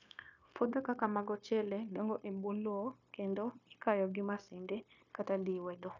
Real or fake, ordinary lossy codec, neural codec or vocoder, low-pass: fake; none; codec, 16 kHz, 0.9 kbps, LongCat-Audio-Codec; 7.2 kHz